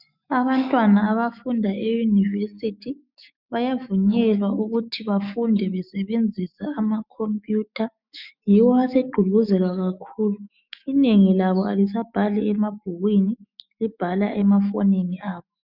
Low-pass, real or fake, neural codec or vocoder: 5.4 kHz; fake; autoencoder, 48 kHz, 128 numbers a frame, DAC-VAE, trained on Japanese speech